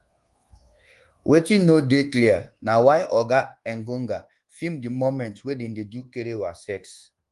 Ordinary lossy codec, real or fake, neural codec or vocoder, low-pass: Opus, 24 kbps; fake; codec, 24 kHz, 1.2 kbps, DualCodec; 10.8 kHz